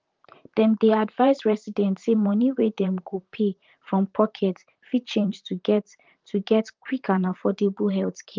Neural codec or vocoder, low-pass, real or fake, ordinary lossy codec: vocoder, 44.1 kHz, 128 mel bands, Pupu-Vocoder; 7.2 kHz; fake; Opus, 32 kbps